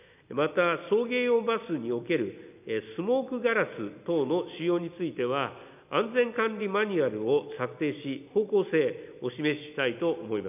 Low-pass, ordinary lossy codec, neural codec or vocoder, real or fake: 3.6 kHz; none; none; real